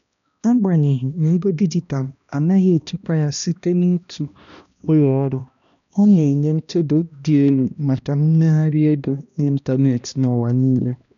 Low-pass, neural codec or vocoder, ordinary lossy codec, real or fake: 7.2 kHz; codec, 16 kHz, 1 kbps, X-Codec, HuBERT features, trained on balanced general audio; none; fake